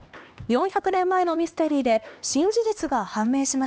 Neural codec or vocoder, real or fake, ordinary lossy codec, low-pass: codec, 16 kHz, 2 kbps, X-Codec, HuBERT features, trained on LibriSpeech; fake; none; none